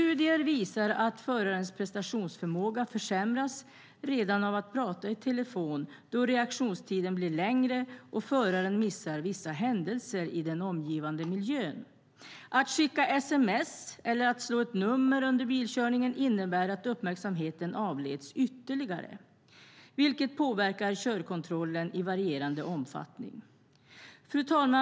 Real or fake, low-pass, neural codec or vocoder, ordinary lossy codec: real; none; none; none